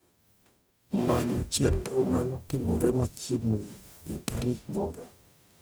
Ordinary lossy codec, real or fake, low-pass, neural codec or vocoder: none; fake; none; codec, 44.1 kHz, 0.9 kbps, DAC